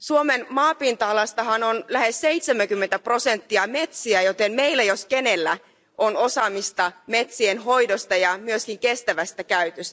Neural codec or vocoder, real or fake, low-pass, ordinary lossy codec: none; real; none; none